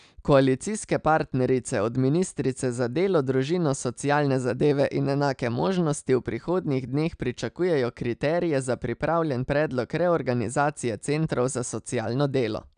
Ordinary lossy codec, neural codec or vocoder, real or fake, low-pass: none; none; real; 9.9 kHz